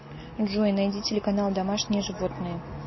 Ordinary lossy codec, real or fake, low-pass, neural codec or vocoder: MP3, 24 kbps; real; 7.2 kHz; none